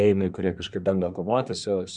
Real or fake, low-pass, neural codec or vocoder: fake; 10.8 kHz; codec, 24 kHz, 1 kbps, SNAC